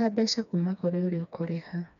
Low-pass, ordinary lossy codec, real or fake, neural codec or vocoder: 7.2 kHz; none; fake; codec, 16 kHz, 2 kbps, FreqCodec, smaller model